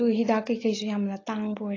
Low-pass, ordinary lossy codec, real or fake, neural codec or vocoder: 7.2 kHz; AAC, 32 kbps; real; none